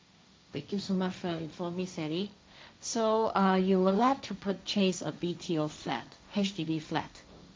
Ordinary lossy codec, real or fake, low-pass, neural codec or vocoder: none; fake; none; codec, 16 kHz, 1.1 kbps, Voila-Tokenizer